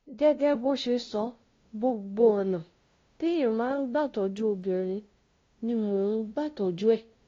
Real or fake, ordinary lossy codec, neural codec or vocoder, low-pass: fake; AAC, 32 kbps; codec, 16 kHz, 0.5 kbps, FunCodec, trained on LibriTTS, 25 frames a second; 7.2 kHz